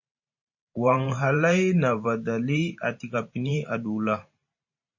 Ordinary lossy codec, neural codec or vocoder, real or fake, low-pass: MP3, 32 kbps; vocoder, 44.1 kHz, 128 mel bands every 512 samples, BigVGAN v2; fake; 7.2 kHz